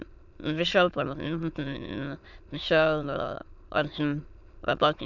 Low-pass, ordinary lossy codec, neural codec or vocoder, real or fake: 7.2 kHz; none; autoencoder, 22.05 kHz, a latent of 192 numbers a frame, VITS, trained on many speakers; fake